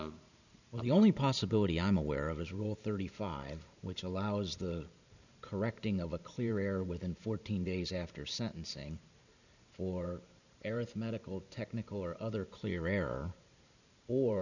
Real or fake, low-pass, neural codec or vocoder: fake; 7.2 kHz; vocoder, 44.1 kHz, 128 mel bands every 512 samples, BigVGAN v2